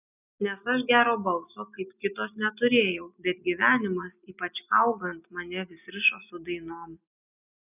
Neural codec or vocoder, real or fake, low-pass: none; real; 3.6 kHz